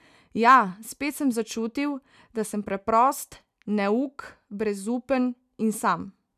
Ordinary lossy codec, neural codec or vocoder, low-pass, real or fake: none; none; 14.4 kHz; real